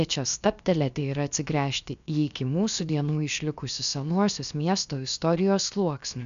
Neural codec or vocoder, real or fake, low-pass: codec, 16 kHz, about 1 kbps, DyCAST, with the encoder's durations; fake; 7.2 kHz